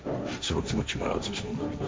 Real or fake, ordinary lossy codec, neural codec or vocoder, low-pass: fake; none; codec, 16 kHz, 1.1 kbps, Voila-Tokenizer; none